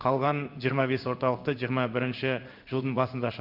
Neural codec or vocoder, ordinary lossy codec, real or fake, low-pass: codec, 16 kHz in and 24 kHz out, 1 kbps, XY-Tokenizer; Opus, 24 kbps; fake; 5.4 kHz